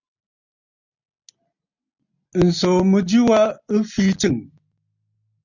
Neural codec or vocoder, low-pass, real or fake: none; 7.2 kHz; real